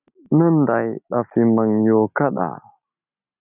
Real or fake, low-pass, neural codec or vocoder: real; 3.6 kHz; none